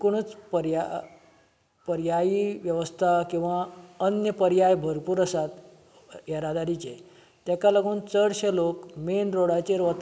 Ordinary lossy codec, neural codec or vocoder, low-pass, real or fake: none; none; none; real